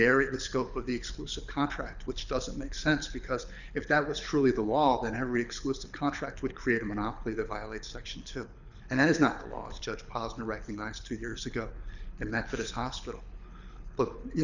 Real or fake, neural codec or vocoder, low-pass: fake; codec, 24 kHz, 6 kbps, HILCodec; 7.2 kHz